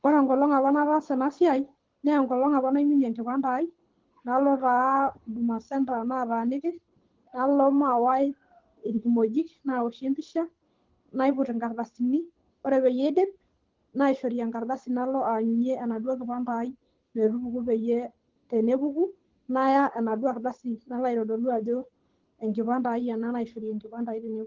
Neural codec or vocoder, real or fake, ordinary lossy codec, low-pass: codec, 24 kHz, 6 kbps, HILCodec; fake; Opus, 16 kbps; 7.2 kHz